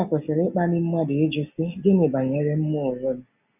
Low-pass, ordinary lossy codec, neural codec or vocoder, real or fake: 3.6 kHz; none; none; real